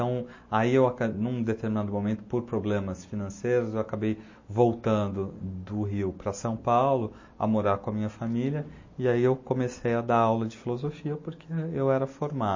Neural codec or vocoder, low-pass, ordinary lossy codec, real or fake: none; 7.2 kHz; MP3, 32 kbps; real